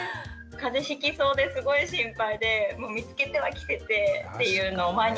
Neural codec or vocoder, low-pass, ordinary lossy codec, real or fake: none; none; none; real